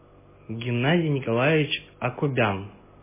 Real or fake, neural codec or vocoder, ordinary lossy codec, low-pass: real; none; MP3, 16 kbps; 3.6 kHz